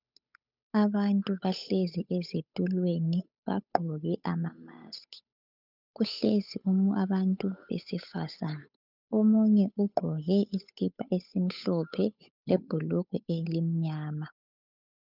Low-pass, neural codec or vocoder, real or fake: 5.4 kHz; codec, 16 kHz, 8 kbps, FunCodec, trained on Chinese and English, 25 frames a second; fake